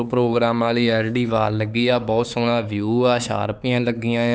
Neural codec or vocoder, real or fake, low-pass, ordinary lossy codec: codec, 16 kHz, 4 kbps, X-Codec, HuBERT features, trained on LibriSpeech; fake; none; none